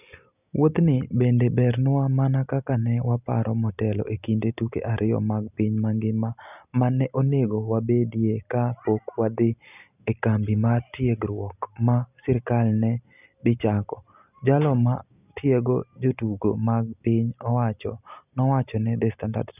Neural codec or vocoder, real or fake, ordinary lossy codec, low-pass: none; real; none; 3.6 kHz